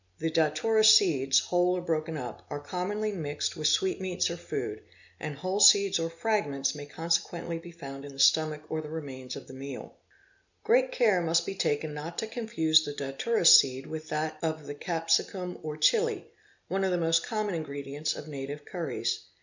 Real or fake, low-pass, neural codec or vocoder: real; 7.2 kHz; none